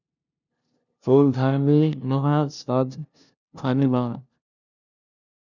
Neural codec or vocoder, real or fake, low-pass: codec, 16 kHz, 0.5 kbps, FunCodec, trained on LibriTTS, 25 frames a second; fake; 7.2 kHz